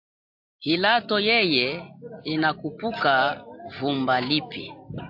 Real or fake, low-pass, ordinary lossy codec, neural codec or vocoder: real; 5.4 kHz; AAC, 48 kbps; none